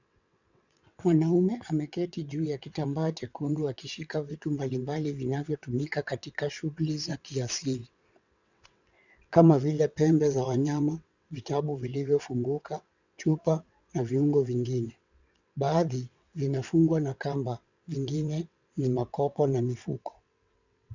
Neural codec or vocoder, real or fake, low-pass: vocoder, 22.05 kHz, 80 mel bands, WaveNeXt; fake; 7.2 kHz